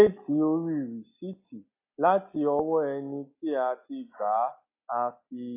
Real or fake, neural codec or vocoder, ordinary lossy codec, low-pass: real; none; AAC, 32 kbps; 3.6 kHz